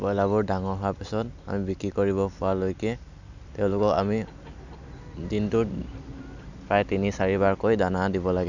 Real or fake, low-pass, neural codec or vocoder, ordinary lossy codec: real; 7.2 kHz; none; none